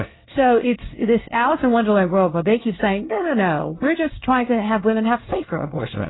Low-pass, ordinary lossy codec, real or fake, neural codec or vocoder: 7.2 kHz; AAC, 16 kbps; fake; codec, 24 kHz, 1 kbps, SNAC